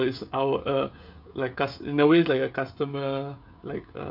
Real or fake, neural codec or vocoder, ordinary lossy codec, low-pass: fake; codec, 16 kHz, 16 kbps, FreqCodec, smaller model; none; 5.4 kHz